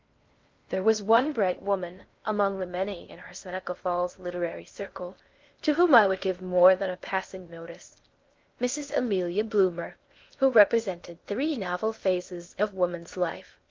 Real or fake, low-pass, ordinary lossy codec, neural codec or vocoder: fake; 7.2 kHz; Opus, 32 kbps; codec, 16 kHz in and 24 kHz out, 0.6 kbps, FocalCodec, streaming, 4096 codes